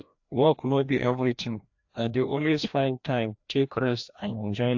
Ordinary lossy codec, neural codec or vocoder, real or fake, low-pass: AAC, 48 kbps; codec, 16 kHz, 1 kbps, FreqCodec, larger model; fake; 7.2 kHz